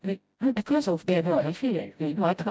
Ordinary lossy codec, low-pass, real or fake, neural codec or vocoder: none; none; fake; codec, 16 kHz, 0.5 kbps, FreqCodec, smaller model